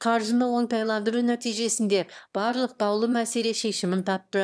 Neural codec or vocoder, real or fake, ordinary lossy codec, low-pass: autoencoder, 22.05 kHz, a latent of 192 numbers a frame, VITS, trained on one speaker; fake; none; none